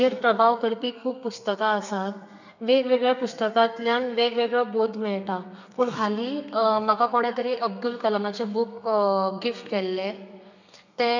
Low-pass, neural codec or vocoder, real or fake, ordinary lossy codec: 7.2 kHz; codec, 32 kHz, 1.9 kbps, SNAC; fake; none